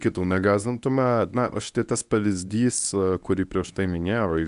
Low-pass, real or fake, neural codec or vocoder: 10.8 kHz; fake; codec, 24 kHz, 0.9 kbps, WavTokenizer, medium speech release version 1